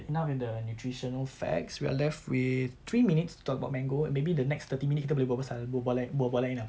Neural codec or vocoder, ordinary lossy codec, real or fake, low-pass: none; none; real; none